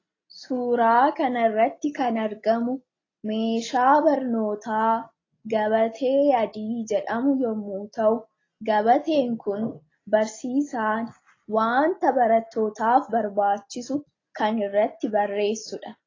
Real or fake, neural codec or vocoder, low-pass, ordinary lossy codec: real; none; 7.2 kHz; AAC, 32 kbps